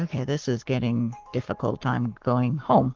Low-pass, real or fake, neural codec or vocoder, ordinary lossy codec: 7.2 kHz; fake; codec, 44.1 kHz, 7.8 kbps, Pupu-Codec; Opus, 16 kbps